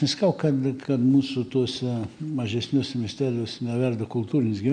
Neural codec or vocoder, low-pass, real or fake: none; 9.9 kHz; real